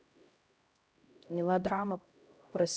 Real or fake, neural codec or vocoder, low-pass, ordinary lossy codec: fake; codec, 16 kHz, 0.5 kbps, X-Codec, HuBERT features, trained on LibriSpeech; none; none